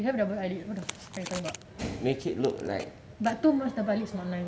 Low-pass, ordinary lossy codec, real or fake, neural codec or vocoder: none; none; real; none